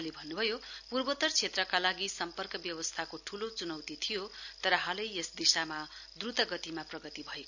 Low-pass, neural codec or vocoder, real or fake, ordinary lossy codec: 7.2 kHz; none; real; none